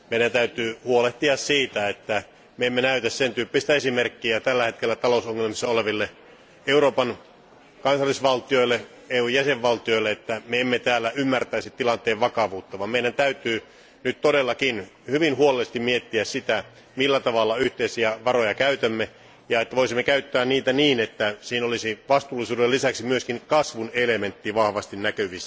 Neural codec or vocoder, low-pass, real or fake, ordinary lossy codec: none; none; real; none